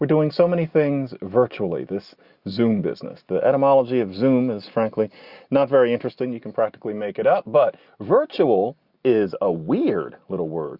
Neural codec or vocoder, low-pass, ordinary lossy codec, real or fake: none; 5.4 kHz; Opus, 64 kbps; real